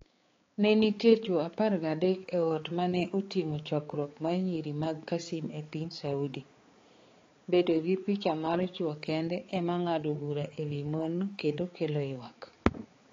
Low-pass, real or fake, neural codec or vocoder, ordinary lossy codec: 7.2 kHz; fake; codec, 16 kHz, 4 kbps, X-Codec, HuBERT features, trained on balanced general audio; AAC, 32 kbps